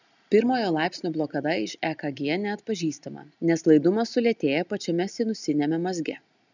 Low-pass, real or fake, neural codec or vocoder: 7.2 kHz; real; none